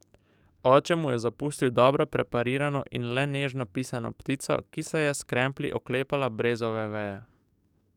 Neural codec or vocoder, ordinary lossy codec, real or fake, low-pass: codec, 44.1 kHz, 7.8 kbps, DAC; none; fake; 19.8 kHz